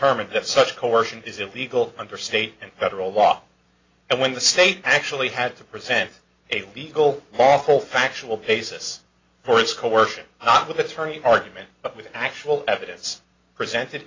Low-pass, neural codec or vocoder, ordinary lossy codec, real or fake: 7.2 kHz; none; AAC, 32 kbps; real